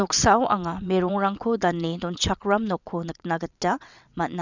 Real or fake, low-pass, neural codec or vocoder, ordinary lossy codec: fake; 7.2 kHz; vocoder, 22.05 kHz, 80 mel bands, WaveNeXt; none